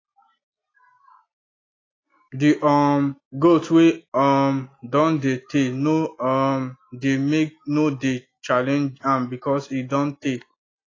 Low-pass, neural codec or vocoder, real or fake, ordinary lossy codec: 7.2 kHz; none; real; AAC, 32 kbps